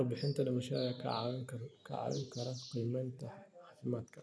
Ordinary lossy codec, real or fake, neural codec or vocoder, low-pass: none; real; none; none